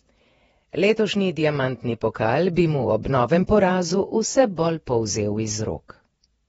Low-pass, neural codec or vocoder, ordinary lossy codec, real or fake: 19.8 kHz; vocoder, 48 kHz, 128 mel bands, Vocos; AAC, 24 kbps; fake